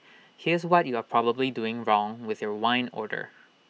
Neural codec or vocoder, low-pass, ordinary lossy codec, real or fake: none; none; none; real